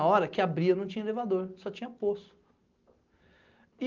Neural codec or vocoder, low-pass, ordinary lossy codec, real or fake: none; 7.2 kHz; Opus, 24 kbps; real